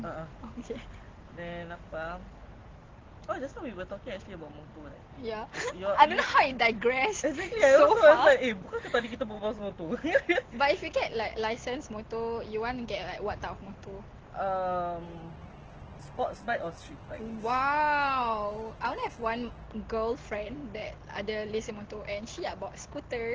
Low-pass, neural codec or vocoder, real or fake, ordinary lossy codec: 7.2 kHz; none; real; Opus, 16 kbps